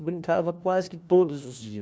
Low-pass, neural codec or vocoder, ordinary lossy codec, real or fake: none; codec, 16 kHz, 1 kbps, FunCodec, trained on LibriTTS, 50 frames a second; none; fake